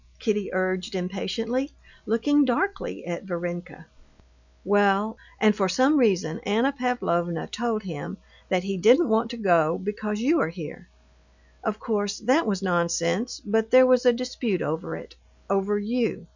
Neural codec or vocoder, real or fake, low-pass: none; real; 7.2 kHz